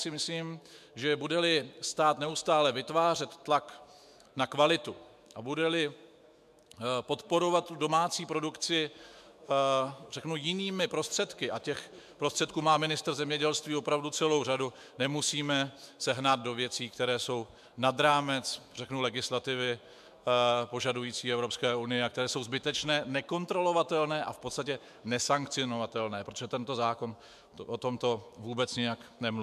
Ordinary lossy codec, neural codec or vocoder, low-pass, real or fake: MP3, 96 kbps; autoencoder, 48 kHz, 128 numbers a frame, DAC-VAE, trained on Japanese speech; 14.4 kHz; fake